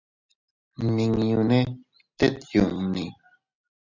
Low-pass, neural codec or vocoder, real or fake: 7.2 kHz; none; real